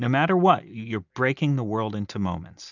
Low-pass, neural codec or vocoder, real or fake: 7.2 kHz; none; real